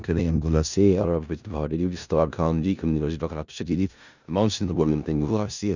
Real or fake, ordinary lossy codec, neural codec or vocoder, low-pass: fake; none; codec, 16 kHz in and 24 kHz out, 0.4 kbps, LongCat-Audio-Codec, four codebook decoder; 7.2 kHz